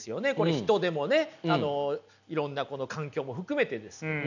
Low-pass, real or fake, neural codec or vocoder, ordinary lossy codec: 7.2 kHz; real; none; none